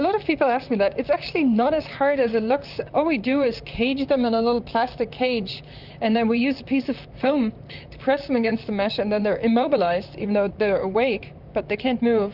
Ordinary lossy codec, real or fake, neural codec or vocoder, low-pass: Opus, 64 kbps; fake; vocoder, 44.1 kHz, 128 mel bands, Pupu-Vocoder; 5.4 kHz